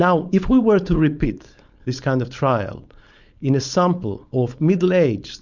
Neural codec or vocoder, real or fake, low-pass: codec, 16 kHz, 4.8 kbps, FACodec; fake; 7.2 kHz